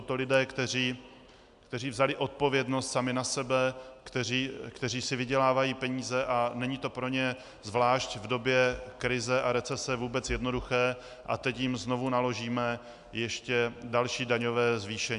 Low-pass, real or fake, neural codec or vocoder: 10.8 kHz; real; none